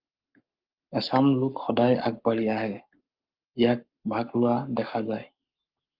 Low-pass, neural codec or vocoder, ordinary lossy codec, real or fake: 5.4 kHz; codec, 44.1 kHz, 7.8 kbps, Pupu-Codec; Opus, 32 kbps; fake